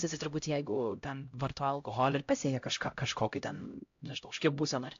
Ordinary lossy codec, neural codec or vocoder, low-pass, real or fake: AAC, 48 kbps; codec, 16 kHz, 0.5 kbps, X-Codec, HuBERT features, trained on LibriSpeech; 7.2 kHz; fake